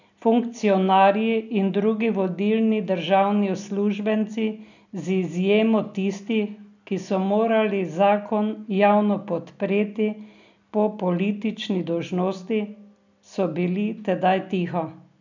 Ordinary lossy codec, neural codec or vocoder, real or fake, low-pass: none; none; real; 7.2 kHz